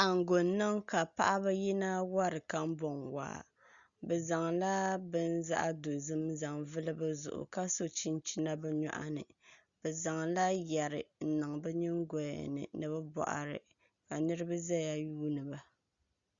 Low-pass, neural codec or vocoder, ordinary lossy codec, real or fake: 7.2 kHz; none; Opus, 64 kbps; real